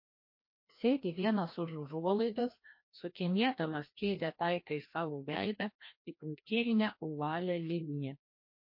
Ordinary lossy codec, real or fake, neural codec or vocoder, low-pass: MP3, 32 kbps; fake; codec, 16 kHz, 1 kbps, FreqCodec, larger model; 5.4 kHz